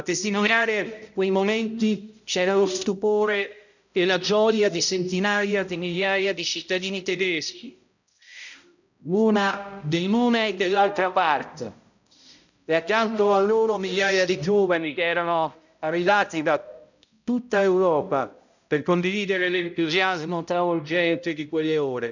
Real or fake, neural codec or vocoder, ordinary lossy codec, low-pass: fake; codec, 16 kHz, 0.5 kbps, X-Codec, HuBERT features, trained on balanced general audio; none; 7.2 kHz